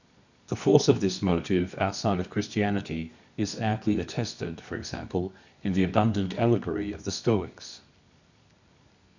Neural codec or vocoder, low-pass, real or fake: codec, 24 kHz, 0.9 kbps, WavTokenizer, medium music audio release; 7.2 kHz; fake